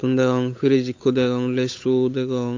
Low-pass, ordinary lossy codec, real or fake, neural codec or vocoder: 7.2 kHz; AAC, 48 kbps; fake; codec, 16 kHz, 8 kbps, FunCodec, trained on Chinese and English, 25 frames a second